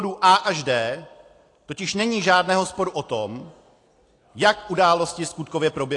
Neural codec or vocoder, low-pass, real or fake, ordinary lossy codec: none; 10.8 kHz; real; AAC, 48 kbps